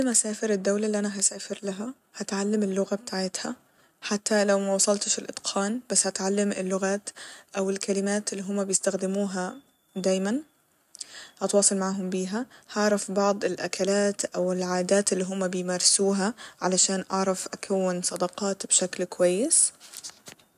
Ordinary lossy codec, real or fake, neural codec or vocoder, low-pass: none; real; none; 14.4 kHz